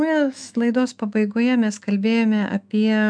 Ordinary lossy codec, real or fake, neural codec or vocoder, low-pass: MP3, 96 kbps; fake; autoencoder, 48 kHz, 128 numbers a frame, DAC-VAE, trained on Japanese speech; 9.9 kHz